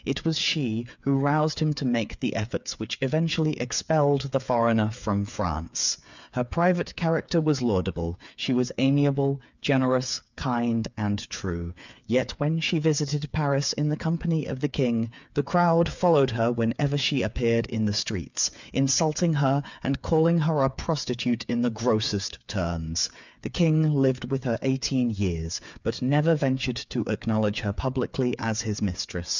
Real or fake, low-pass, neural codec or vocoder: fake; 7.2 kHz; codec, 16 kHz, 8 kbps, FreqCodec, smaller model